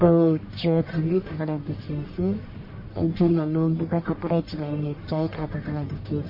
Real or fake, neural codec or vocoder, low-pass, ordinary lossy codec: fake; codec, 44.1 kHz, 1.7 kbps, Pupu-Codec; 5.4 kHz; MP3, 24 kbps